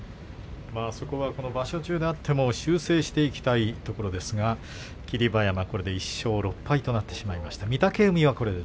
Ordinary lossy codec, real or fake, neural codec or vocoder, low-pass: none; real; none; none